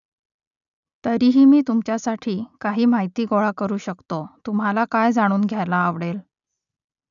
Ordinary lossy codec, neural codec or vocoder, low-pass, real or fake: none; none; 7.2 kHz; real